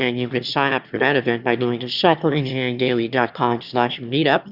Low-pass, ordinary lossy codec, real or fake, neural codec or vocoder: 5.4 kHz; Opus, 64 kbps; fake; autoencoder, 22.05 kHz, a latent of 192 numbers a frame, VITS, trained on one speaker